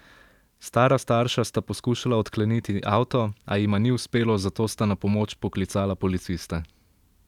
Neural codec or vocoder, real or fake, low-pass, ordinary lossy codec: vocoder, 44.1 kHz, 128 mel bands every 512 samples, BigVGAN v2; fake; 19.8 kHz; none